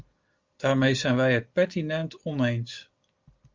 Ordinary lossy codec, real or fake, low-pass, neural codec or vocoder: Opus, 32 kbps; real; 7.2 kHz; none